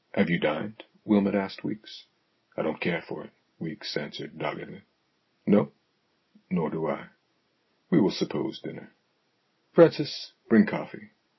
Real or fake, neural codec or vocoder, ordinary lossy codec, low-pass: real; none; MP3, 24 kbps; 7.2 kHz